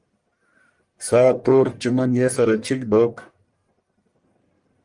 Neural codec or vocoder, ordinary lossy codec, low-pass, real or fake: codec, 44.1 kHz, 1.7 kbps, Pupu-Codec; Opus, 32 kbps; 10.8 kHz; fake